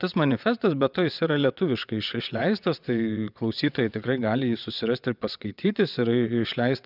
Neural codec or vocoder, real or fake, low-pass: vocoder, 22.05 kHz, 80 mel bands, WaveNeXt; fake; 5.4 kHz